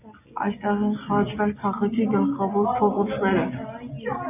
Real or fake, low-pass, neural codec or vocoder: real; 3.6 kHz; none